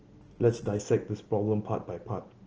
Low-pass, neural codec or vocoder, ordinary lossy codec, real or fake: 7.2 kHz; none; Opus, 16 kbps; real